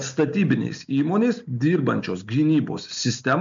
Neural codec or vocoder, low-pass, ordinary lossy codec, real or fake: none; 7.2 kHz; MP3, 64 kbps; real